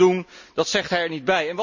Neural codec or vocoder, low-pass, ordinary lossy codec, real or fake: none; 7.2 kHz; none; real